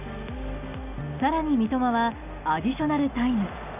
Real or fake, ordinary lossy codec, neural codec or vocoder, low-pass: real; none; none; 3.6 kHz